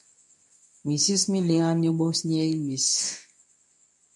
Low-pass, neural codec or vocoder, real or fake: 10.8 kHz; codec, 24 kHz, 0.9 kbps, WavTokenizer, medium speech release version 2; fake